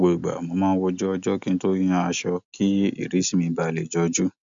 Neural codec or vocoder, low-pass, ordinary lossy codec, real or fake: none; 7.2 kHz; MP3, 64 kbps; real